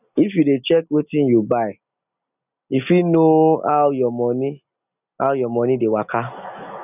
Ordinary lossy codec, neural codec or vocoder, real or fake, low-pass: none; none; real; 3.6 kHz